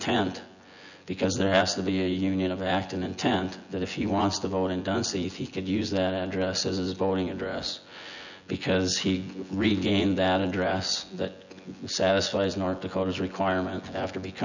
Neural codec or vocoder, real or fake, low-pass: vocoder, 24 kHz, 100 mel bands, Vocos; fake; 7.2 kHz